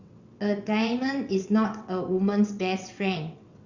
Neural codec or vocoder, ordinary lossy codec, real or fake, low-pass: vocoder, 22.05 kHz, 80 mel bands, WaveNeXt; Opus, 64 kbps; fake; 7.2 kHz